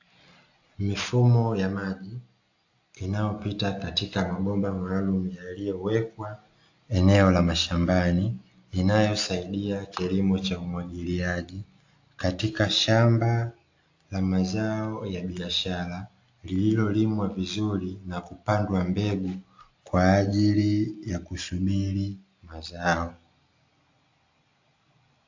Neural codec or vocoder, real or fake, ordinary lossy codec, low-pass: none; real; AAC, 48 kbps; 7.2 kHz